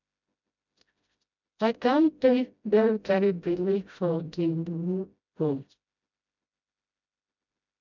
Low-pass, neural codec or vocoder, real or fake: 7.2 kHz; codec, 16 kHz, 0.5 kbps, FreqCodec, smaller model; fake